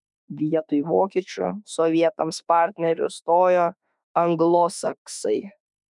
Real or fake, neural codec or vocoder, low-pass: fake; autoencoder, 48 kHz, 32 numbers a frame, DAC-VAE, trained on Japanese speech; 10.8 kHz